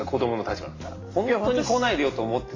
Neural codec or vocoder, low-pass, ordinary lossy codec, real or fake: none; 7.2 kHz; AAC, 32 kbps; real